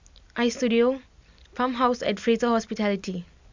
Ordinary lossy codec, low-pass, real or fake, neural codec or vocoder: MP3, 64 kbps; 7.2 kHz; real; none